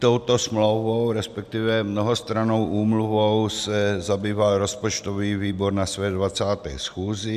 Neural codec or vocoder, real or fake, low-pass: none; real; 14.4 kHz